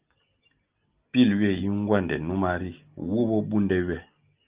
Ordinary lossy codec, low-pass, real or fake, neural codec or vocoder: Opus, 24 kbps; 3.6 kHz; real; none